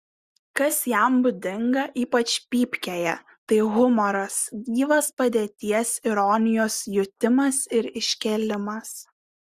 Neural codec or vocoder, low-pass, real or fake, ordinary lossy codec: none; 14.4 kHz; real; Opus, 64 kbps